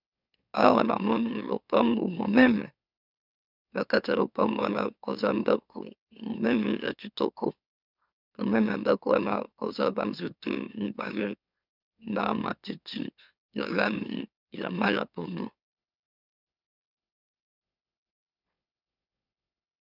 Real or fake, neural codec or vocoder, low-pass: fake; autoencoder, 44.1 kHz, a latent of 192 numbers a frame, MeloTTS; 5.4 kHz